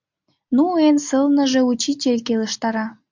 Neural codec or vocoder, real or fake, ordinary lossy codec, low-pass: none; real; MP3, 64 kbps; 7.2 kHz